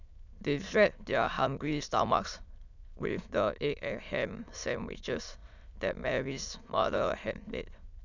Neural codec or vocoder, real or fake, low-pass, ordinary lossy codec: autoencoder, 22.05 kHz, a latent of 192 numbers a frame, VITS, trained on many speakers; fake; 7.2 kHz; none